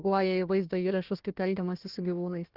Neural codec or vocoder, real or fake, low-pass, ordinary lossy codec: codec, 16 kHz, 1 kbps, FunCodec, trained on Chinese and English, 50 frames a second; fake; 5.4 kHz; Opus, 16 kbps